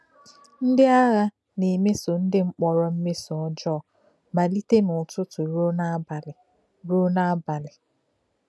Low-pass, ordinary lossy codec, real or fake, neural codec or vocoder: none; none; real; none